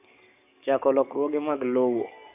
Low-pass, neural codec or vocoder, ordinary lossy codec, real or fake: 3.6 kHz; codec, 44.1 kHz, 7.8 kbps, DAC; none; fake